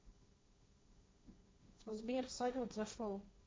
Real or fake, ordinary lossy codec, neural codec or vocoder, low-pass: fake; none; codec, 16 kHz, 1.1 kbps, Voila-Tokenizer; none